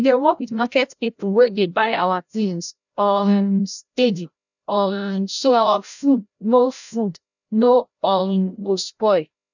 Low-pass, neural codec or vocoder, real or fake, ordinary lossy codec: 7.2 kHz; codec, 16 kHz, 0.5 kbps, FreqCodec, larger model; fake; none